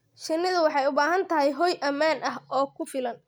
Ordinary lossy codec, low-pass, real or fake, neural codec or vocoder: none; none; real; none